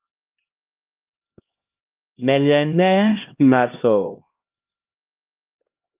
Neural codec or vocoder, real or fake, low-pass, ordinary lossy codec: codec, 16 kHz, 1 kbps, X-Codec, HuBERT features, trained on LibriSpeech; fake; 3.6 kHz; Opus, 24 kbps